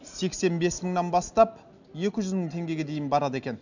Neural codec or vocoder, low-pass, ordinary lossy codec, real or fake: none; 7.2 kHz; none; real